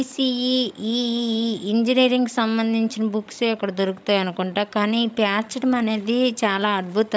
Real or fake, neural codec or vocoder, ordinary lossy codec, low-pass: fake; codec, 16 kHz, 16 kbps, FreqCodec, larger model; none; none